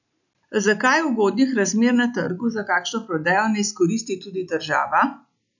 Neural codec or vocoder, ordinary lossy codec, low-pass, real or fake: none; none; 7.2 kHz; real